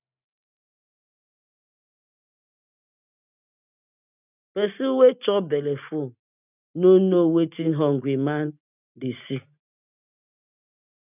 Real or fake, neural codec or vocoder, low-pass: real; none; 3.6 kHz